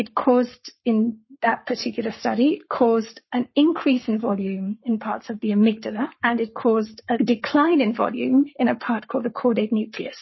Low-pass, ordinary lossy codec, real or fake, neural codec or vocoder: 7.2 kHz; MP3, 24 kbps; fake; codec, 44.1 kHz, 7.8 kbps, Pupu-Codec